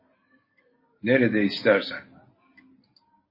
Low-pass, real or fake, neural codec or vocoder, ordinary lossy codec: 5.4 kHz; real; none; MP3, 24 kbps